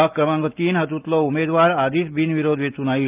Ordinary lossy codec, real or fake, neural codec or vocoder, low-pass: Opus, 32 kbps; real; none; 3.6 kHz